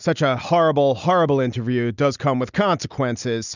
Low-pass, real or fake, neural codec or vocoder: 7.2 kHz; real; none